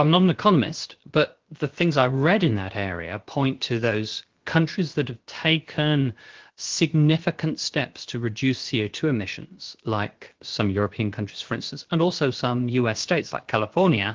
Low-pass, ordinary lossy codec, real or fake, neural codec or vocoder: 7.2 kHz; Opus, 16 kbps; fake; codec, 16 kHz, about 1 kbps, DyCAST, with the encoder's durations